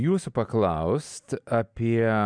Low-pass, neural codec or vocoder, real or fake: 9.9 kHz; none; real